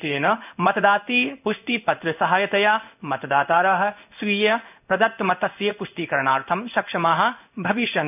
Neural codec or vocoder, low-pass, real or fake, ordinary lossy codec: codec, 16 kHz in and 24 kHz out, 1 kbps, XY-Tokenizer; 3.6 kHz; fake; none